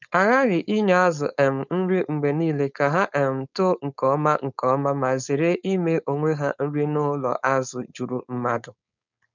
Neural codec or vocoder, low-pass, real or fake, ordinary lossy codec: codec, 16 kHz, 4.8 kbps, FACodec; 7.2 kHz; fake; none